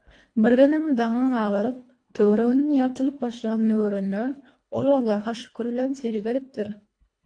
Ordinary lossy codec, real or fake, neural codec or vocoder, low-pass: AAC, 48 kbps; fake; codec, 24 kHz, 1.5 kbps, HILCodec; 9.9 kHz